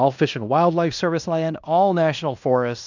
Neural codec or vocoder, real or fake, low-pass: codec, 16 kHz in and 24 kHz out, 0.9 kbps, LongCat-Audio-Codec, fine tuned four codebook decoder; fake; 7.2 kHz